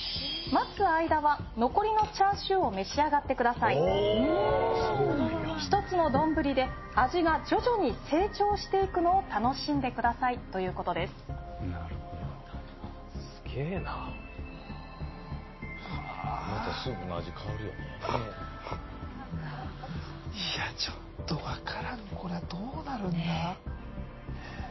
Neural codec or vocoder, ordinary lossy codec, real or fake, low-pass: none; MP3, 24 kbps; real; 7.2 kHz